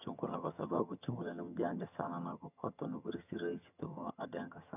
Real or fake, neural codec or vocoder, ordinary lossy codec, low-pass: fake; vocoder, 22.05 kHz, 80 mel bands, HiFi-GAN; none; 3.6 kHz